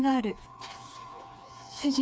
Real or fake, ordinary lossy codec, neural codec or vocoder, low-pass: fake; none; codec, 16 kHz, 4 kbps, FreqCodec, smaller model; none